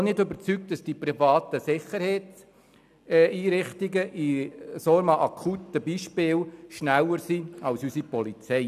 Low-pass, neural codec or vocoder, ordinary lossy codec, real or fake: 14.4 kHz; none; none; real